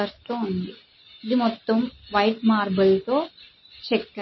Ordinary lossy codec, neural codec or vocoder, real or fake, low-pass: MP3, 24 kbps; none; real; 7.2 kHz